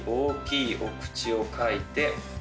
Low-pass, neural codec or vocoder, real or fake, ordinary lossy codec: none; none; real; none